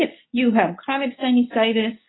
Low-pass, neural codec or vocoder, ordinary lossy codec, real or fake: 7.2 kHz; codec, 24 kHz, 0.9 kbps, WavTokenizer, medium speech release version 2; AAC, 16 kbps; fake